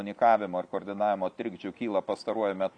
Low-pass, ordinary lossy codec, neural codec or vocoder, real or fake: 9.9 kHz; AAC, 64 kbps; none; real